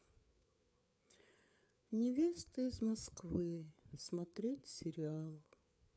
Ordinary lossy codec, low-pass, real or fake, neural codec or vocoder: none; none; fake; codec, 16 kHz, 4 kbps, FreqCodec, larger model